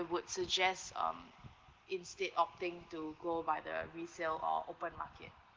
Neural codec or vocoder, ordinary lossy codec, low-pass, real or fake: none; Opus, 16 kbps; 7.2 kHz; real